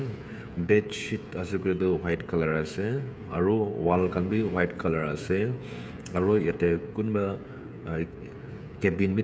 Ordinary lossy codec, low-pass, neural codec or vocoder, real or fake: none; none; codec, 16 kHz, 16 kbps, FreqCodec, smaller model; fake